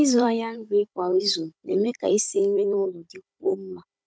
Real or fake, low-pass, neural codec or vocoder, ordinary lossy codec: fake; none; codec, 16 kHz, 16 kbps, FunCodec, trained on Chinese and English, 50 frames a second; none